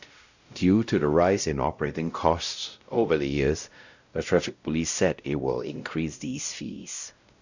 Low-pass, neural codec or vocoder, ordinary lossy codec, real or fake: 7.2 kHz; codec, 16 kHz, 0.5 kbps, X-Codec, WavLM features, trained on Multilingual LibriSpeech; none; fake